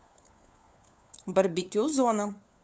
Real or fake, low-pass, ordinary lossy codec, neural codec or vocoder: fake; none; none; codec, 16 kHz, 4 kbps, FunCodec, trained on LibriTTS, 50 frames a second